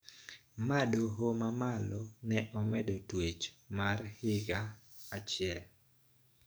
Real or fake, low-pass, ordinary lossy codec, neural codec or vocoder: fake; none; none; codec, 44.1 kHz, 7.8 kbps, DAC